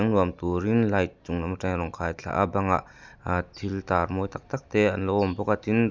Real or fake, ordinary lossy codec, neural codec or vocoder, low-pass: real; none; none; none